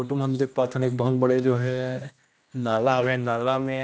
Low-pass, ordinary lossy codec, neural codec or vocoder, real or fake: none; none; codec, 16 kHz, 1 kbps, X-Codec, HuBERT features, trained on general audio; fake